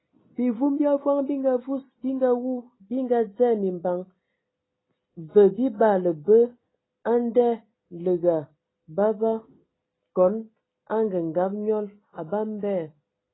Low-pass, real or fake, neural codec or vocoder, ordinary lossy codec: 7.2 kHz; real; none; AAC, 16 kbps